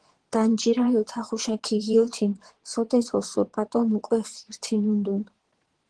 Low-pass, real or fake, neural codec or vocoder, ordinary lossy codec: 9.9 kHz; fake; vocoder, 22.05 kHz, 80 mel bands, Vocos; Opus, 16 kbps